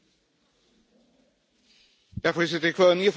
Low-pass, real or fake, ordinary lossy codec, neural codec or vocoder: none; real; none; none